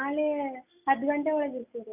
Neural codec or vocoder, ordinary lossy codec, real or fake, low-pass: none; none; real; 3.6 kHz